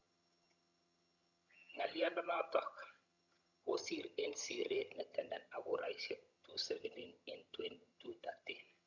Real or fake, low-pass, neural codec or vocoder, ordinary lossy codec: fake; 7.2 kHz; vocoder, 22.05 kHz, 80 mel bands, HiFi-GAN; none